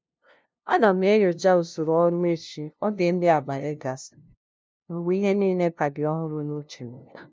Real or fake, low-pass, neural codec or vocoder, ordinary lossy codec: fake; none; codec, 16 kHz, 0.5 kbps, FunCodec, trained on LibriTTS, 25 frames a second; none